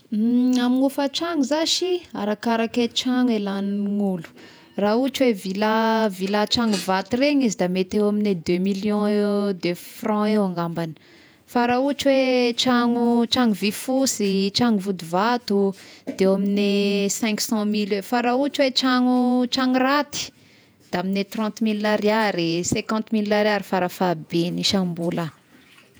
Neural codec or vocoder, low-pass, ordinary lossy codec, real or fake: vocoder, 48 kHz, 128 mel bands, Vocos; none; none; fake